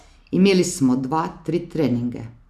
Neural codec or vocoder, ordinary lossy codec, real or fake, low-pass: none; none; real; 14.4 kHz